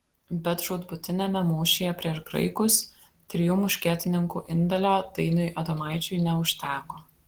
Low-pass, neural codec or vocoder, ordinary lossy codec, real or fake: 19.8 kHz; none; Opus, 16 kbps; real